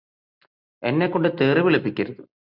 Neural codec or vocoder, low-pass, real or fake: none; 5.4 kHz; real